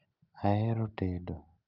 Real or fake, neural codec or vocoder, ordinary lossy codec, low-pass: real; none; Opus, 24 kbps; 5.4 kHz